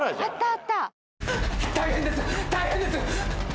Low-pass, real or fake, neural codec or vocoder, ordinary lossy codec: none; real; none; none